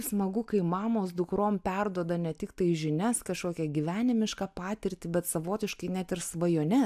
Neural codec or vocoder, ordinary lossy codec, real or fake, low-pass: none; AAC, 96 kbps; real; 14.4 kHz